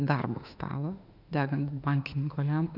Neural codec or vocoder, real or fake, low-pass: autoencoder, 48 kHz, 32 numbers a frame, DAC-VAE, trained on Japanese speech; fake; 5.4 kHz